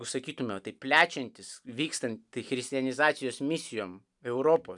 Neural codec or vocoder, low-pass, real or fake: none; 10.8 kHz; real